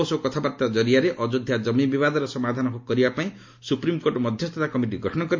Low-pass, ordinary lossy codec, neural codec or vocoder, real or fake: 7.2 kHz; MP3, 48 kbps; none; real